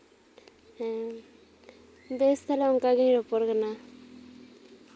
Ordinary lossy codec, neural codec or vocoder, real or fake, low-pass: none; none; real; none